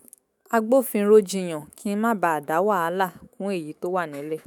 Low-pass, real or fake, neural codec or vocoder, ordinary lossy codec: none; fake; autoencoder, 48 kHz, 128 numbers a frame, DAC-VAE, trained on Japanese speech; none